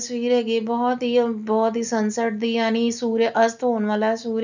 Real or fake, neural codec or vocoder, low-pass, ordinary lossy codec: real; none; 7.2 kHz; none